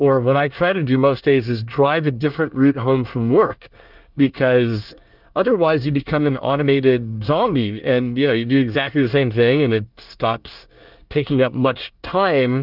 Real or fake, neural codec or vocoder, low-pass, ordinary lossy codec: fake; codec, 24 kHz, 1 kbps, SNAC; 5.4 kHz; Opus, 24 kbps